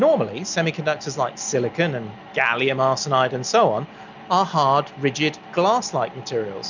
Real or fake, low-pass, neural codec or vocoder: real; 7.2 kHz; none